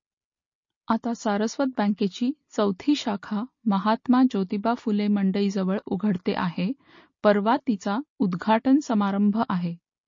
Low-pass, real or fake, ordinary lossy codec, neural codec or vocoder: 7.2 kHz; real; MP3, 32 kbps; none